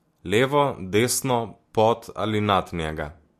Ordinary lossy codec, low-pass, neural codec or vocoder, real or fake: MP3, 64 kbps; 14.4 kHz; none; real